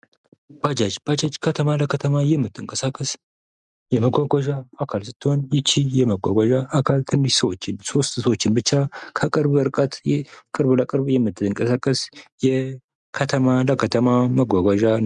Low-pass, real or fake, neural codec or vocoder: 10.8 kHz; real; none